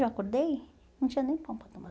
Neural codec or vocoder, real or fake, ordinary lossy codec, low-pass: none; real; none; none